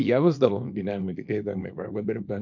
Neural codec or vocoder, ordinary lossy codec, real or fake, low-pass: codec, 24 kHz, 0.9 kbps, WavTokenizer, small release; MP3, 48 kbps; fake; 7.2 kHz